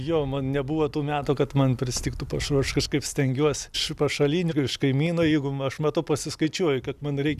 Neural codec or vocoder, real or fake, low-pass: none; real; 14.4 kHz